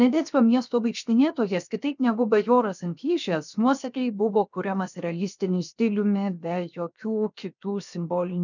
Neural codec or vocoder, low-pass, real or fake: codec, 16 kHz, 0.7 kbps, FocalCodec; 7.2 kHz; fake